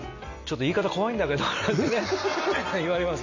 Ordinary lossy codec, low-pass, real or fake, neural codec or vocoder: none; 7.2 kHz; real; none